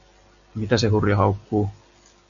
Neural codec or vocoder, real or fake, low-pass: none; real; 7.2 kHz